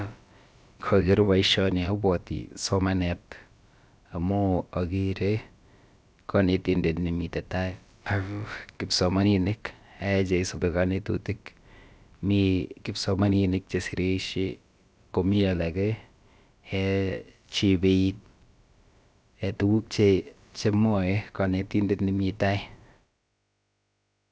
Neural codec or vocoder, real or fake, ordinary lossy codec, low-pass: codec, 16 kHz, about 1 kbps, DyCAST, with the encoder's durations; fake; none; none